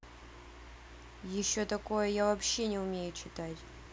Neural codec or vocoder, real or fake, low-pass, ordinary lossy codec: none; real; none; none